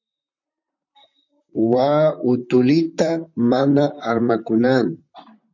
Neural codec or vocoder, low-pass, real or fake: vocoder, 44.1 kHz, 128 mel bands, Pupu-Vocoder; 7.2 kHz; fake